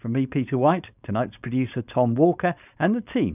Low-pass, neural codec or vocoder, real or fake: 3.6 kHz; codec, 16 kHz, 4.8 kbps, FACodec; fake